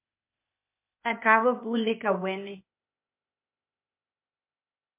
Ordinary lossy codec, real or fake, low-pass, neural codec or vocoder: MP3, 32 kbps; fake; 3.6 kHz; codec, 16 kHz, 0.8 kbps, ZipCodec